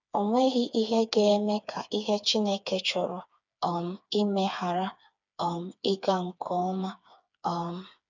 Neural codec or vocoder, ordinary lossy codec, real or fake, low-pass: codec, 16 kHz, 4 kbps, FreqCodec, smaller model; none; fake; 7.2 kHz